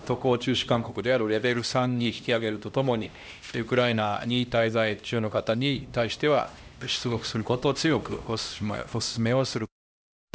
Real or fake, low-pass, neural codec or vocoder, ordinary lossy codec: fake; none; codec, 16 kHz, 1 kbps, X-Codec, HuBERT features, trained on LibriSpeech; none